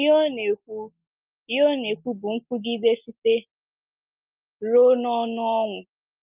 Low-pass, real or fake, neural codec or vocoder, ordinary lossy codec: 3.6 kHz; real; none; Opus, 24 kbps